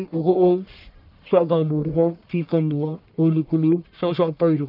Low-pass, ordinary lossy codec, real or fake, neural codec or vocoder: 5.4 kHz; none; fake; codec, 44.1 kHz, 1.7 kbps, Pupu-Codec